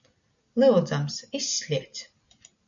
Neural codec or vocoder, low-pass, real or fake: none; 7.2 kHz; real